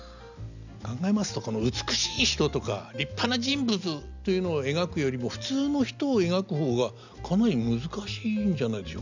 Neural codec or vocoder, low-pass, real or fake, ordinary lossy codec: none; 7.2 kHz; real; none